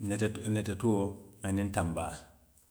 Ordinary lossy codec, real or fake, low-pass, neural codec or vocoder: none; fake; none; autoencoder, 48 kHz, 128 numbers a frame, DAC-VAE, trained on Japanese speech